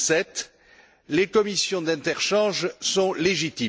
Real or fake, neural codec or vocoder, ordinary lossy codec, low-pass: real; none; none; none